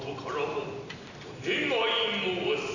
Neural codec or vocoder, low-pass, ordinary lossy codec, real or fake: none; 7.2 kHz; none; real